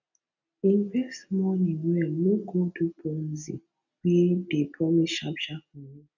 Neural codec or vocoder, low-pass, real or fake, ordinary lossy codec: none; 7.2 kHz; real; none